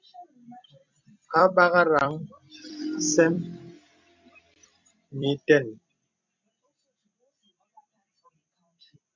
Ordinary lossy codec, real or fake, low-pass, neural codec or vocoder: MP3, 64 kbps; fake; 7.2 kHz; vocoder, 44.1 kHz, 128 mel bands every 256 samples, BigVGAN v2